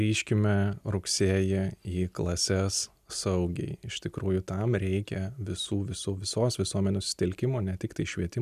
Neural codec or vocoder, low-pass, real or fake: none; 14.4 kHz; real